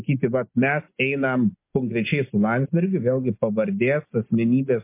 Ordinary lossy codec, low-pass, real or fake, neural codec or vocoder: MP3, 24 kbps; 3.6 kHz; real; none